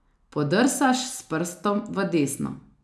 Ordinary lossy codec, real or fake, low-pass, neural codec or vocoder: none; real; none; none